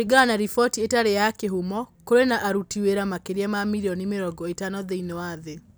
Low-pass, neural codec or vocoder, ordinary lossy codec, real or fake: none; none; none; real